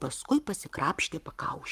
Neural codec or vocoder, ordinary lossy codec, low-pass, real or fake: codec, 44.1 kHz, 7.8 kbps, Pupu-Codec; Opus, 32 kbps; 14.4 kHz; fake